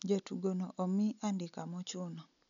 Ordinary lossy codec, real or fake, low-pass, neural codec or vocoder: MP3, 96 kbps; real; 7.2 kHz; none